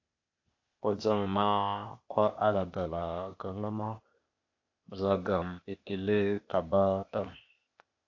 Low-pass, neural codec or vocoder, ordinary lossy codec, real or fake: 7.2 kHz; codec, 16 kHz, 0.8 kbps, ZipCodec; AAC, 48 kbps; fake